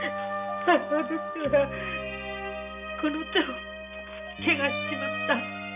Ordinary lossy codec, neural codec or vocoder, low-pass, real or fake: none; none; 3.6 kHz; real